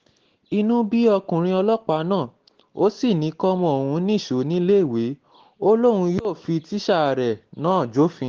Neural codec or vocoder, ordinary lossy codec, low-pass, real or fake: none; Opus, 16 kbps; 7.2 kHz; real